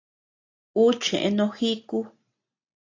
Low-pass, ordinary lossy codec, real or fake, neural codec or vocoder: 7.2 kHz; AAC, 32 kbps; real; none